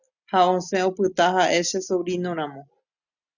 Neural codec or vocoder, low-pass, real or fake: none; 7.2 kHz; real